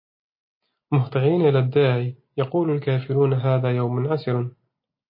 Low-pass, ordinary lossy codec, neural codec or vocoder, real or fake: 5.4 kHz; MP3, 24 kbps; none; real